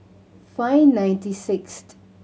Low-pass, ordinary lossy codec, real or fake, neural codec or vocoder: none; none; real; none